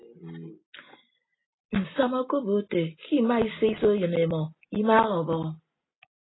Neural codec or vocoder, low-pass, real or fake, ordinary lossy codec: none; 7.2 kHz; real; AAC, 16 kbps